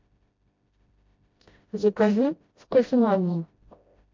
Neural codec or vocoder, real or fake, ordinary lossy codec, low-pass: codec, 16 kHz, 0.5 kbps, FreqCodec, smaller model; fake; MP3, 48 kbps; 7.2 kHz